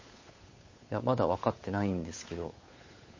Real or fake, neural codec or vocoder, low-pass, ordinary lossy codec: fake; codec, 16 kHz, 8 kbps, FunCodec, trained on Chinese and English, 25 frames a second; 7.2 kHz; MP3, 32 kbps